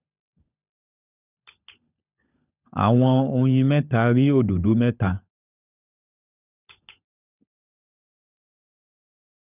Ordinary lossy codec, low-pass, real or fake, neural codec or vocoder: none; 3.6 kHz; fake; codec, 16 kHz, 16 kbps, FunCodec, trained on LibriTTS, 50 frames a second